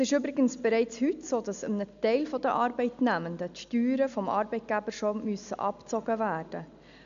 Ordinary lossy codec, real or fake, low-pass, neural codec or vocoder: none; real; 7.2 kHz; none